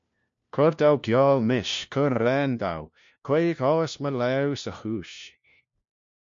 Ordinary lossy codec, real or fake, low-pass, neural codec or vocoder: MP3, 48 kbps; fake; 7.2 kHz; codec, 16 kHz, 1 kbps, FunCodec, trained on LibriTTS, 50 frames a second